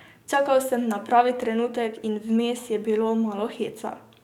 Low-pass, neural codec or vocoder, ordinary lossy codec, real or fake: 19.8 kHz; codec, 44.1 kHz, 7.8 kbps, DAC; none; fake